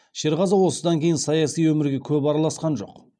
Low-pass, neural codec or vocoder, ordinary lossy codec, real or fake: 9.9 kHz; none; none; real